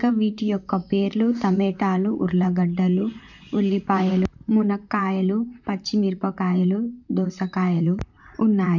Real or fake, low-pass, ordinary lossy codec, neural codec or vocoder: fake; 7.2 kHz; none; vocoder, 44.1 kHz, 128 mel bands, Pupu-Vocoder